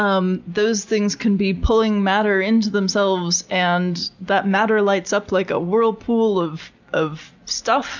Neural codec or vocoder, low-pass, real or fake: none; 7.2 kHz; real